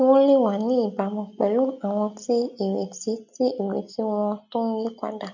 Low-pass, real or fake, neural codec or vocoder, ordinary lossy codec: 7.2 kHz; real; none; none